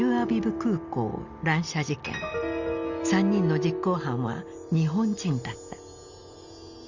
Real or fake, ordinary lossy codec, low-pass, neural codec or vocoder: real; Opus, 64 kbps; 7.2 kHz; none